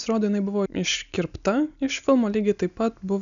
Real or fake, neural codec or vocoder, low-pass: real; none; 7.2 kHz